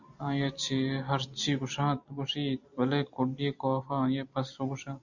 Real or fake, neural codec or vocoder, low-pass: real; none; 7.2 kHz